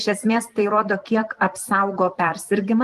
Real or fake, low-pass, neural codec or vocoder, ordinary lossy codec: fake; 14.4 kHz; vocoder, 44.1 kHz, 128 mel bands every 256 samples, BigVGAN v2; Opus, 24 kbps